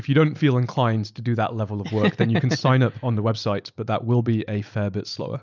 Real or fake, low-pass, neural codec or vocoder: real; 7.2 kHz; none